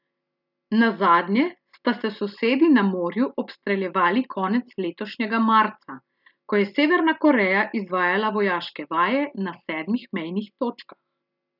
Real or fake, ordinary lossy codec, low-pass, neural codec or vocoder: real; none; 5.4 kHz; none